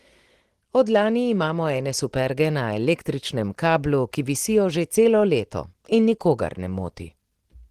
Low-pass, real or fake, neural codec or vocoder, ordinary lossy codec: 14.4 kHz; real; none; Opus, 16 kbps